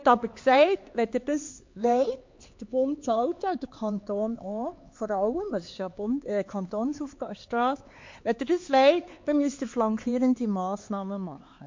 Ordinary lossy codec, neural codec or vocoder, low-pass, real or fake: MP3, 48 kbps; codec, 16 kHz, 4 kbps, X-Codec, HuBERT features, trained on LibriSpeech; 7.2 kHz; fake